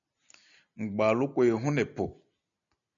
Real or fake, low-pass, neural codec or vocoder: real; 7.2 kHz; none